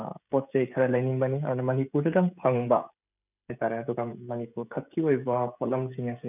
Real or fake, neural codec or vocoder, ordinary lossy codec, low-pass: fake; codec, 16 kHz, 16 kbps, FreqCodec, smaller model; none; 3.6 kHz